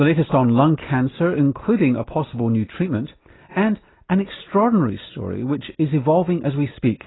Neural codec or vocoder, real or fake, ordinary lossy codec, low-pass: none; real; AAC, 16 kbps; 7.2 kHz